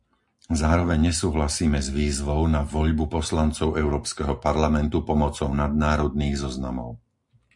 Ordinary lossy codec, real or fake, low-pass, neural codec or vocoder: MP3, 64 kbps; real; 10.8 kHz; none